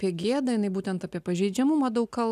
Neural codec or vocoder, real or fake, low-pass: none; real; 14.4 kHz